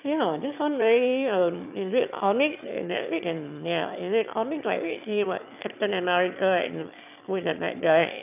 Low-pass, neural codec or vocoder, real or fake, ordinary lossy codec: 3.6 kHz; autoencoder, 22.05 kHz, a latent of 192 numbers a frame, VITS, trained on one speaker; fake; none